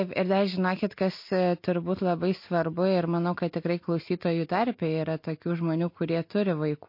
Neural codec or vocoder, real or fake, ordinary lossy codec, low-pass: none; real; MP3, 32 kbps; 5.4 kHz